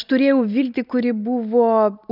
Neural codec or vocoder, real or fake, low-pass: none; real; 5.4 kHz